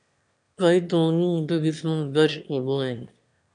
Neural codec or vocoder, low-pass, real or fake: autoencoder, 22.05 kHz, a latent of 192 numbers a frame, VITS, trained on one speaker; 9.9 kHz; fake